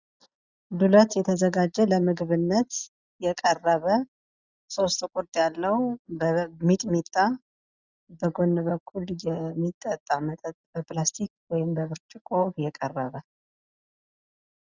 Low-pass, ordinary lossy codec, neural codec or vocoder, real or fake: 7.2 kHz; Opus, 64 kbps; none; real